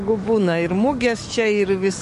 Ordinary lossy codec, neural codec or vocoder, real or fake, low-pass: MP3, 48 kbps; codec, 44.1 kHz, 7.8 kbps, DAC; fake; 14.4 kHz